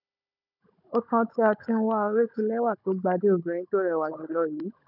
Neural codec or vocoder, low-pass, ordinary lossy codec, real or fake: codec, 16 kHz, 16 kbps, FunCodec, trained on Chinese and English, 50 frames a second; 5.4 kHz; none; fake